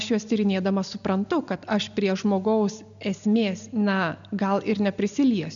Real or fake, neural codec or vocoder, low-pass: real; none; 7.2 kHz